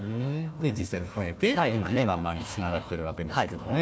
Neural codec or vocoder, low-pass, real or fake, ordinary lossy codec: codec, 16 kHz, 1 kbps, FunCodec, trained on Chinese and English, 50 frames a second; none; fake; none